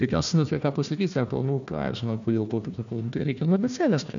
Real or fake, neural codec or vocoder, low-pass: fake; codec, 16 kHz, 1 kbps, FunCodec, trained on Chinese and English, 50 frames a second; 7.2 kHz